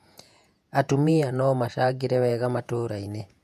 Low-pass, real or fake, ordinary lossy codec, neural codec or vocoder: 14.4 kHz; fake; MP3, 96 kbps; vocoder, 48 kHz, 128 mel bands, Vocos